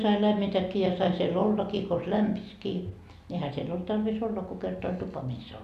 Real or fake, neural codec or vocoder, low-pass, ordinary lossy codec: real; none; 14.4 kHz; AAC, 96 kbps